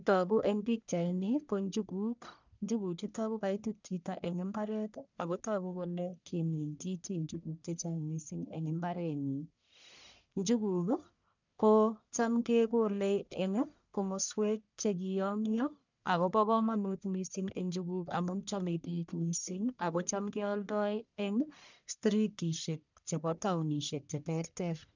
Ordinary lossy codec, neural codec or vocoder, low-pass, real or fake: none; codec, 44.1 kHz, 1.7 kbps, Pupu-Codec; 7.2 kHz; fake